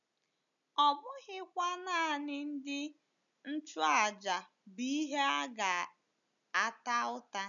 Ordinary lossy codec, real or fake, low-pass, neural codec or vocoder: none; real; 7.2 kHz; none